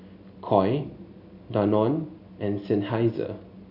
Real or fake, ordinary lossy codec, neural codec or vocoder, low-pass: real; none; none; 5.4 kHz